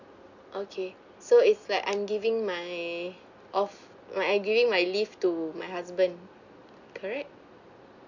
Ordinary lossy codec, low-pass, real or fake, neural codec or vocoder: none; 7.2 kHz; real; none